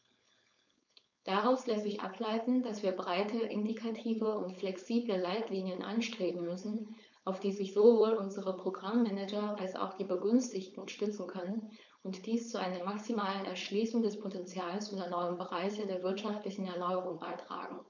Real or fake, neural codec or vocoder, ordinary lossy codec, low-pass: fake; codec, 16 kHz, 4.8 kbps, FACodec; none; 7.2 kHz